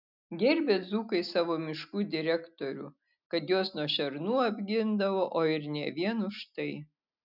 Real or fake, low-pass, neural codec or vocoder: real; 5.4 kHz; none